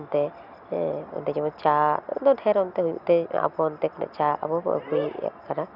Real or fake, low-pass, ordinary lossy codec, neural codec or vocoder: real; 5.4 kHz; none; none